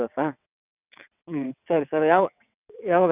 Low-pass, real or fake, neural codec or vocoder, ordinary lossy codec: 3.6 kHz; fake; vocoder, 22.05 kHz, 80 mel bands, WaveNeXt; none